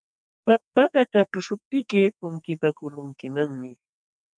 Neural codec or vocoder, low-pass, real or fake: codec, 44.1 kHz, 2.6 kbps, SNAC; 9.9 kHz; fake